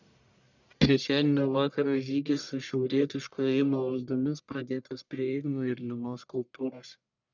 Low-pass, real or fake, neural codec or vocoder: 7.2 kHz; fake; codec, 44.1 kHz, 1.7 kbps, Pupu-Codec